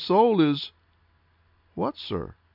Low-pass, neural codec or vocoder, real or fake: 5.4 kHz; none; real